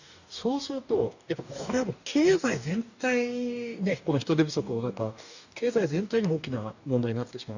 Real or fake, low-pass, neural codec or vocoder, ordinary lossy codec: fake; 7.2 kHz; codec, 44.1 kHz, 2.6 kbps, DAC; none